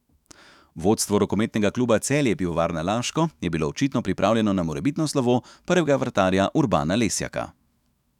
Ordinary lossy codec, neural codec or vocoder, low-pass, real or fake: none; autoencoder, 48 kHz, 128 numbers a frame, DAC-VAE, trained on Japanese speech; 19.8 kHz; fake